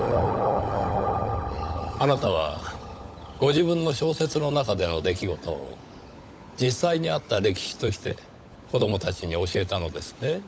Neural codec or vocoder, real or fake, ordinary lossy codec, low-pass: codec, 16 kHz, 16 kbps, FunCodec, trained on LibriTTS, 50 frames a second; fake; none; none